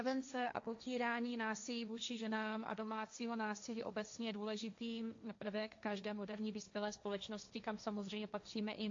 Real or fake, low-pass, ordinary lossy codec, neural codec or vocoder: fake; 7.2 kHz; AAC, 48 kbps; codec, 16 kHz, 1.1 kbps, Voila-Tokenizer